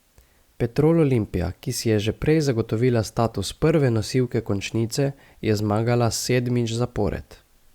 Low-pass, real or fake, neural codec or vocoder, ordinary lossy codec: 19.8 kHz; real; none; Opus, 64 kbps